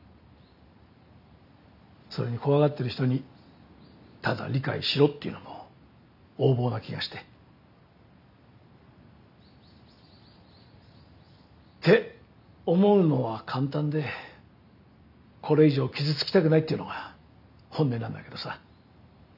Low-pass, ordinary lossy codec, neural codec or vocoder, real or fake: 5.4 kHz; none; none; real